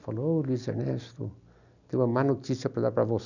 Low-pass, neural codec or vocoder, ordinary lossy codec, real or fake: 7.2 kHz; none; none; real